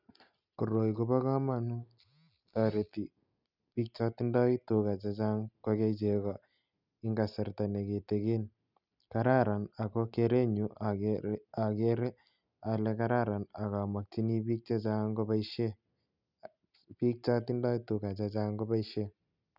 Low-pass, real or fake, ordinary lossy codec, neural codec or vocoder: 5.4 kHz; real; none; none